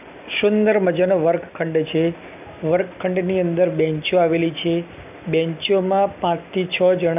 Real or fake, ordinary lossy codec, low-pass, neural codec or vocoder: real; none; 3.6 kHz; none